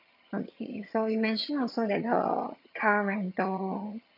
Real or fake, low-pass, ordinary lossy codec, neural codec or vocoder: fake; 5.4 kHz; none; vocoder, 22.05 kHz, 80 mel bands, HiFi-GAN